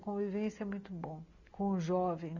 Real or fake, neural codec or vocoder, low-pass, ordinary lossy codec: real; none; 7.2 kHz; none